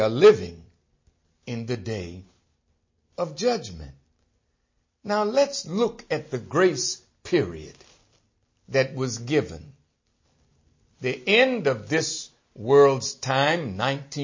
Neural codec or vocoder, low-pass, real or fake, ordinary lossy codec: none; 7.2 kHz; real; MP3, 32 kbps